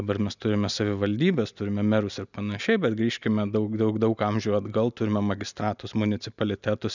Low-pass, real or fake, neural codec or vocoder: 7.2 kHz; real; none